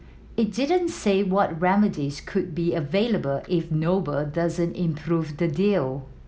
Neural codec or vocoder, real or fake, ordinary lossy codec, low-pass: none; real; none; none